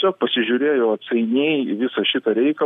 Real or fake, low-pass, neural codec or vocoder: real; 14.4 kHz; none